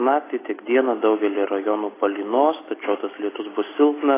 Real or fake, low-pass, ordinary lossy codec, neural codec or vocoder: real; 3.6 kHz; AAC, 16 kbps; none